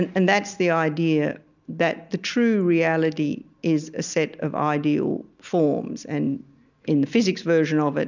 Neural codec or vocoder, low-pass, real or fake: none; 7.2 kHz; real